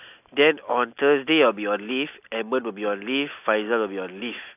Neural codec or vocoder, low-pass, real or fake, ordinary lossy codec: none; 3.6 kHz; real; AAC, 32 kbps